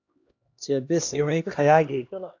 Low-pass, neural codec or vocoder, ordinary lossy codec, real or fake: 7.2 kHz; codec, 16 kHz, 1 kbps, X-Codec, HuBERT features, trained on LibriSpeech; AAC, 48 kbps; fake